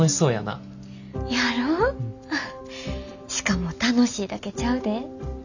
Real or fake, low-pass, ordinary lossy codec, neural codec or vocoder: real; 7.2 kHz; none; none